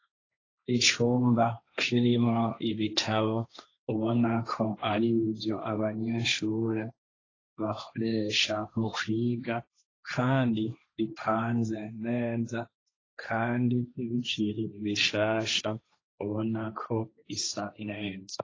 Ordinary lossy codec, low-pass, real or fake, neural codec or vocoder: AAC, 32 kbps; 7.2 kHz; fake; codec, 16 kHz, 1.1 kbps, Voila-Tokenizer